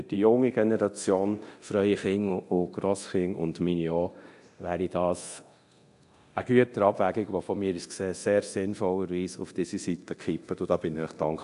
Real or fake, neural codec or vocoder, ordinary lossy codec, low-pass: fake; codec, 24 kHz, 0.9 kbps, DualCodec; AAC, 64 kbps; 10.8 kHz